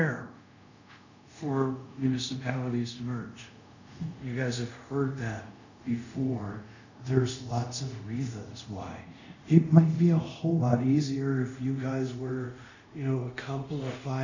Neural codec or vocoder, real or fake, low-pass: codec, 24 kHz, 0.5 kbps, DualCodec; fake; 7.2 kHz